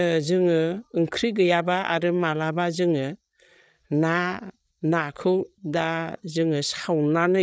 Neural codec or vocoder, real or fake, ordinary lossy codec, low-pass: codec, 16 kHz, 8 kbps, FreqCodec, larger model; fake; none; none